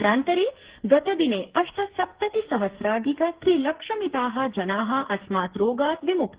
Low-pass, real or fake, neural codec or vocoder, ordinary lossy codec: 3.6 kHz; fake; codec, 44.1 kHz, 2.6 kbps, SNAC; Opus, 16 kbps